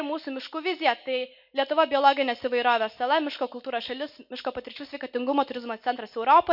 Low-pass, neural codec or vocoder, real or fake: 5.4 kHz; none; real